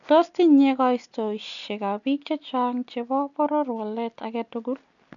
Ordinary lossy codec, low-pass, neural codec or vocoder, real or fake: none; 7.2 kHz; none; real